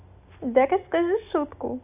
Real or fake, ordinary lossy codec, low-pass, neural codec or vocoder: real; AAC, 32 kbps; 3.6 kHz; none